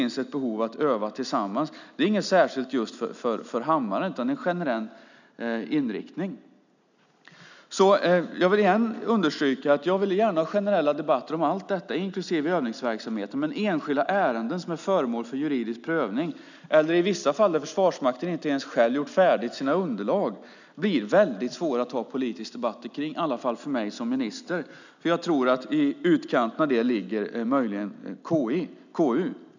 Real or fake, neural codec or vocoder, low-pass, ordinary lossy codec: real; none; 7.2 kHz; none